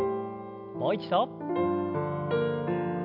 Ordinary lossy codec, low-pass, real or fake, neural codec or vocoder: none; 5.4 kHz; real; none